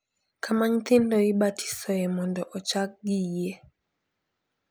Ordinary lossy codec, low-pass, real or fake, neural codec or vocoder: none; none; real; none